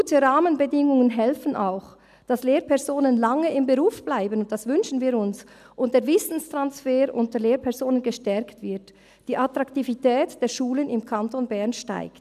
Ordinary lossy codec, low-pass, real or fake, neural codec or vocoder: none; 14.4 kHz; real; none